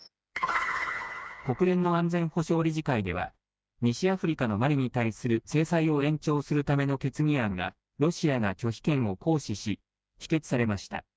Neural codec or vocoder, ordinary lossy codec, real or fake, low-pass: codec, 16 kHz, 2 kbps, FreqCodec, smaller model; none; fake; none